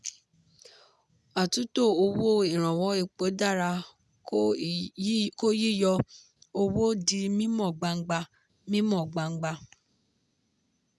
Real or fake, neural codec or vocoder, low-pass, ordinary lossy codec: real; none; none; none